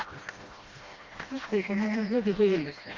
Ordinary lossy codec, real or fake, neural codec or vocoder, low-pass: Opus, 32 kbps; fake; codec, 16 kHz, 1 kbps, FreqCodec, smaller model; 7.2 kHz